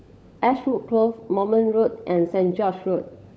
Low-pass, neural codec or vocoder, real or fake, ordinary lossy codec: none; codec, 16 kHz, 16 kbps, FunCodec, trained on LibriTTS, 50 frames a second; fake; none